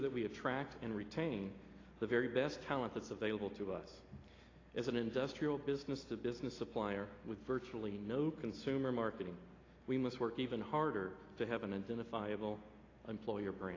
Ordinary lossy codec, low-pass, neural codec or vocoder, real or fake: AAC, 32 kbps; 7.2 kHz; none; real